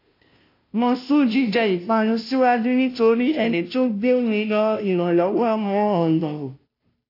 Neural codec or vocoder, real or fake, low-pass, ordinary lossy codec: codec, 16 kHz, 0.5 kbps, FunCodec, trained on Chinese and English, 25 frames a second; fake; 5.4 kHz; AAC, 32 kbps